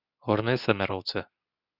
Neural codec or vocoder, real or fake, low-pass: codec, 24 kHz, 0.9 kbps, WavTokenizer, medium speech release version 2; fake; 5.4 kHz